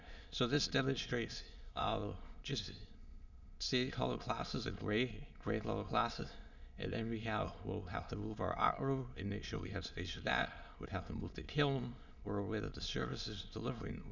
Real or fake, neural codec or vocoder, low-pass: fake; autoencoder, 22.05 kHz, a latent of 192 numbers a frame, VITS, trained on many speakers; 7.2 kHz